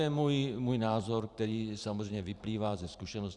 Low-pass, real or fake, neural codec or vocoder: 10.8 kHz; fake; vocoder, 44.1 kHz, 128 mel bands every 512 samples, BigVGAN v2